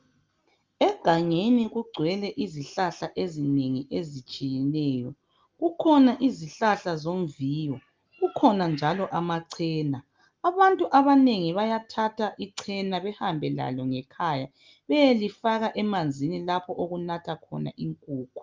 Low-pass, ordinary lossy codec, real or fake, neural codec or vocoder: 7.2 kHz; Opus, 32 kbps; real; none